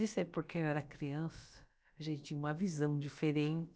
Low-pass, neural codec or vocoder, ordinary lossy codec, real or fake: none; codec, 16 kHz, about 1 kbps, DyCAST, with the encoder's durations; none; fake